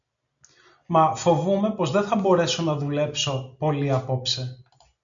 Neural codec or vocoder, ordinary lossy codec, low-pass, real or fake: none; MP3, 64 kbps; 7.2 kHz; real